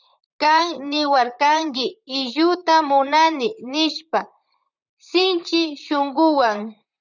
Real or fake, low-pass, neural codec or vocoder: fake; 7.2 kHz; vocoder, 44.1 kHz, 128 mel bands, Pupu-Vocoder